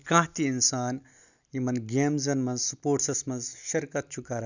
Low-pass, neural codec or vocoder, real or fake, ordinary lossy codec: 7.2 kHz; none; real; none